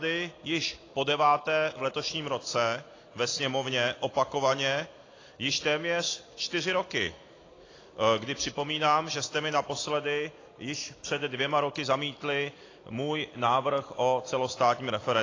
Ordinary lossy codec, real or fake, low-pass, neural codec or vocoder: AAC, 32 kbps; real; 7.2 kHz; none